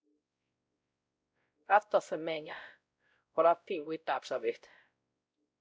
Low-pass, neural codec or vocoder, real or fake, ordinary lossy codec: none; codec, 16 kHz, 0.5 kbps, X-Codec, WavLM features, trained on Multilingual LibriSpeech; fake; none